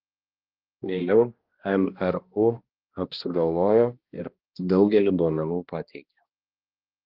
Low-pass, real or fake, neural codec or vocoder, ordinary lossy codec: 5.4 kHz; fake; codec, 16 kHz, 1 kbps, X-Codec, HuBERT features, trained on balanced general audio; Opus, 32 kbps